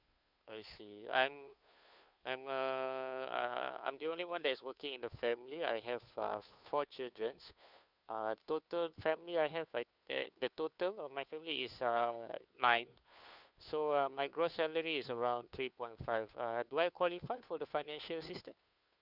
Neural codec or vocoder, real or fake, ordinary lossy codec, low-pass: codec, 16 kHz, 2 kbps, FunCodec, trained on Chinese and English, 25 frames a second; fake; none; 5.4 kHz